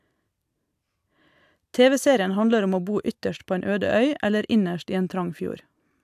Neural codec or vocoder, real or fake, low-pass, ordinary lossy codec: none; real; 14.4 kHz; AAC, 96 kbps